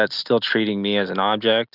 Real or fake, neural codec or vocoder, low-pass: real; none; 5.4 kHz